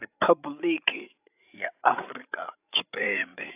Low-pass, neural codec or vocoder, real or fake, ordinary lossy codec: 3.6 kHz; codec, 16 kHz, 16 kbps, FreqCodec, larger model; fake; AAC, 32 kbps